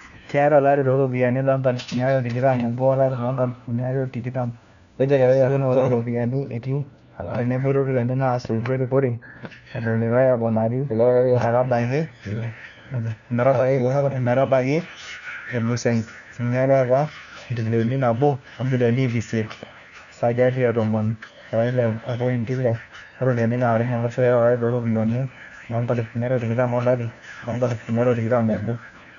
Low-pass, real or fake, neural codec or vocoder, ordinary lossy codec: 7.2 kHz; fake; codec, 16 kHz, 1 kbps, FunCodec, trained on LibriTTS, 50 frames a second; none